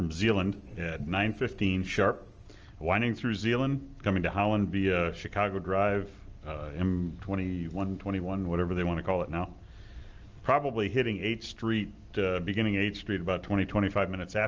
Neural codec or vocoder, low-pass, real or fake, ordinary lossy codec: none; 7.2 kHz; real; Opus, 24 kbps